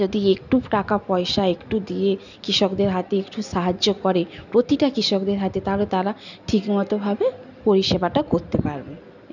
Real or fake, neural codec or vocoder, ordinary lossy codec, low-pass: real; none; none; 7.2 kHz